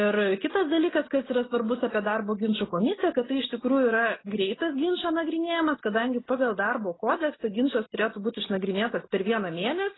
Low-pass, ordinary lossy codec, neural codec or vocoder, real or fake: 7.2 kHz; AAC, 16 kbps; none; real